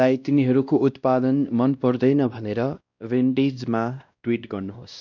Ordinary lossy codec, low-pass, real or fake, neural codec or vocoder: none; 7.2 kHz; fake; codec, 16 kHz, 1 kbps, X-Codec, WavLM features, trained on Multilingual LibriSpeech